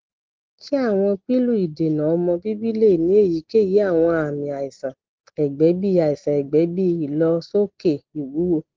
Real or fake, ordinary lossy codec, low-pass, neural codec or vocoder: real; Opus, 16 kbps; 7.2 kHz; none